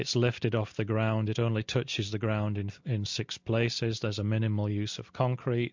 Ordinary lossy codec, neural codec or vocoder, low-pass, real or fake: MP3, 64 kbps; none; 7.2 kHz; real